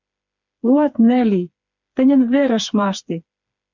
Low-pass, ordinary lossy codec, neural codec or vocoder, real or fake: 7.2 kHz; MP3, 64 kbps; codec, 16 kHz, 4 kbps, FreqCodec, smaller model; fake